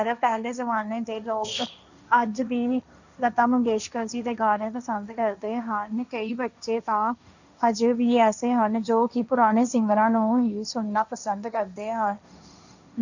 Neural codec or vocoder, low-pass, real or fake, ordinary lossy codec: codec, 16 kHz, 1.1 kbps, Voila-Tokenizer; 7.2 kHz; fake; none